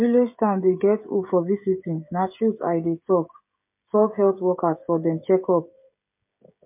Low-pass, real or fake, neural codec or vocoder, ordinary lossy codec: 3.6 kHz; fake; codec, 16 kHz, 16 kbps, FreqCodec, smaller model; MP3, 32 kbps